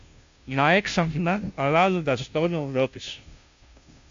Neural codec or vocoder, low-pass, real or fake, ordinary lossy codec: codec, 16 kHz, 0.5 kbps, FunCodec, trained on Chinese and English, 25 frames a second; 7.2 kHz; fake; AAC, 64 kbps